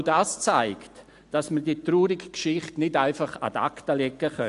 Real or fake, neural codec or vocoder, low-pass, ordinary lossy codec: real; none; 10.8 kHz; AAC, 64 kbps